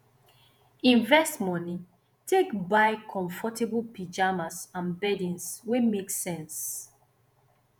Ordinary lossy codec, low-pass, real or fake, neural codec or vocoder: none; none; fake; vocoder, 48 kHz, 128 mel bands, Vocos